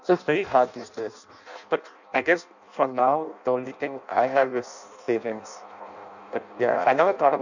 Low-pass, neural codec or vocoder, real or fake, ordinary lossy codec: 7.2 kHz; codec, 16 kHz in and 24 kHz out, 0.6 kbps, FireRedTTS-2 codec; fake; none